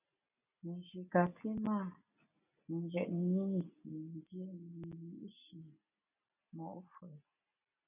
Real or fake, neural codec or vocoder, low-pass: real; none; 3.6 kHz